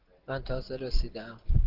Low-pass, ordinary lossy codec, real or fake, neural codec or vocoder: 5.4 kHz; Opus, 16 kbps; real; none